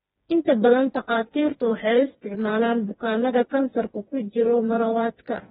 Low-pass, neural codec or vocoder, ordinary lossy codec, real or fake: 7.2 kHz; codec, 16 kHz, 2 kbps, FreqCodec, smaller model; AAC, 16 kbps; fake